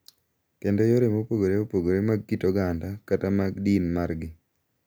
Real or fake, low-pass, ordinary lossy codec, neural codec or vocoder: real; none; none; none